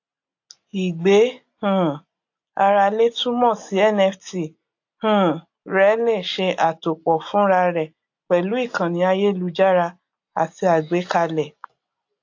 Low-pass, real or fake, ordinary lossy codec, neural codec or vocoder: 7.2 kHz; real; AAC, 48 kbps; none